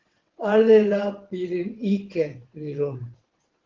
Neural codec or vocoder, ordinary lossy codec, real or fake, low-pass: vocoder, 22.05 kHz, 80 mel bands, WaveNeXt; Opus, 16 kbps; fake; 7.2 kHz